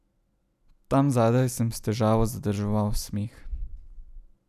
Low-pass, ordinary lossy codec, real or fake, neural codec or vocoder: 14.4 kHz; none; real; none